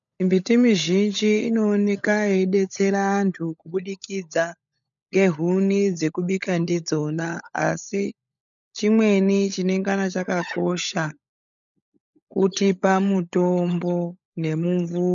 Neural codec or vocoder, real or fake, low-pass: codec, 16 kHz, 16 kbps, FunCodec, trained on LibriTTS, 50 frames a second; fake; 7.2 kHz